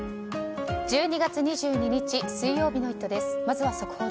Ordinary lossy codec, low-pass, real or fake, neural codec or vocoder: none; none; real; none